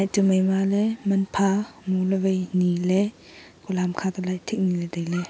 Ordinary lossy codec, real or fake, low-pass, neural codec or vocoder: none; real; none; none